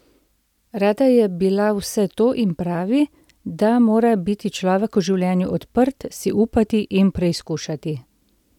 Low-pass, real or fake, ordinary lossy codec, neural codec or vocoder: 19.8 kHz; real; none; none